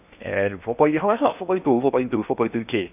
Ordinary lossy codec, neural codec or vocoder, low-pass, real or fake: none; codec, 16 kHz in and 24 kHz out, 0.8 kbps, FocalCodec, streaming, 65536 codes; 3.6 kHz; fake